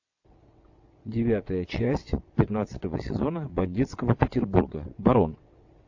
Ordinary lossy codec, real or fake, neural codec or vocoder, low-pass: AAC, 48 kbps; fake; vocoder, 22.05 kHz, 80 mel bands, WaveNeXt; 7.2 kHz